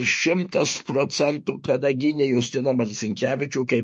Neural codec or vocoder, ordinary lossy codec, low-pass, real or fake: autoencoder, 48 kHz, 32 numbers a frame, DAC-VAE, trained on Japanese speech; MP3, 48 kbps; 10.8 kHz; fake